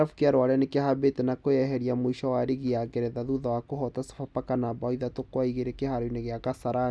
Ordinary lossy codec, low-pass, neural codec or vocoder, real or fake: none; none; none; real